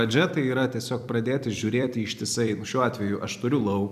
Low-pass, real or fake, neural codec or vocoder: 14.4 kHz; fake; vocoder, 44.1 kHz, 128 mel bands every 256 samples, BigVGAN v2